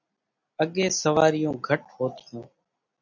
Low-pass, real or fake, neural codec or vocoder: 7.2 kHz; real; none